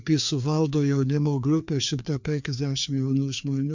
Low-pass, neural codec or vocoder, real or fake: 7.2 kHz; codec, 16 kHz, 2 kbps, FreqCodec, larger model; fake